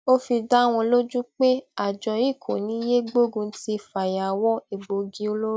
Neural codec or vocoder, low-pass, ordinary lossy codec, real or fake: none; none; none; real